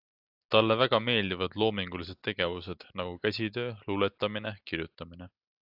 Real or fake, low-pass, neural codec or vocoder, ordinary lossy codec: real; 5.4 kHz; none; AAC, 48 kbps